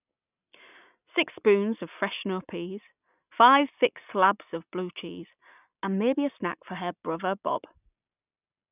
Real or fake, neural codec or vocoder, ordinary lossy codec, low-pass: real; none; none; 3.6 kHz